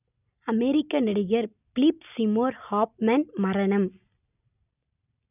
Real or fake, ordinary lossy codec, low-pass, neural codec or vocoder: real; none; 3.6 kHz; none